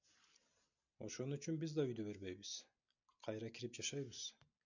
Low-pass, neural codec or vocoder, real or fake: 7.2 kHz; none; real